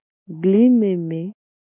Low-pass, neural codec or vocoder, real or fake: 3.6 kHz; none; real